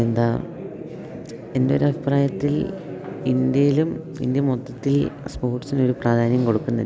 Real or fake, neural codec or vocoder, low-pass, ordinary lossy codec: real; none; none; none